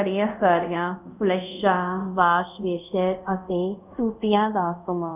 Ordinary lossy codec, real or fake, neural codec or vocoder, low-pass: none; fake; codec, 24 kHz, 0.5 kbps, DualCodec; 3.6 kHz